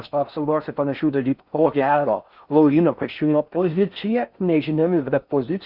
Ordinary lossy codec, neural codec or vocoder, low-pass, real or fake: Opus, 64 kbps; codec, 16 kHz in and 24 kHz out, 0.6 kbps, FocalCodec, streaming, 4096 codes; 5.4 kHz; fake